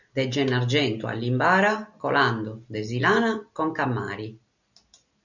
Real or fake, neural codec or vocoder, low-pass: real; none; 7.2 kHz